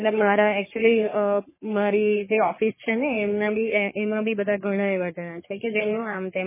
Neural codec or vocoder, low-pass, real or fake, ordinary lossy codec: codec, 44.1 kHz, 3.4 kbps, Pupu-Codec; 3.6 kHz; fake; MP3, 16 kbps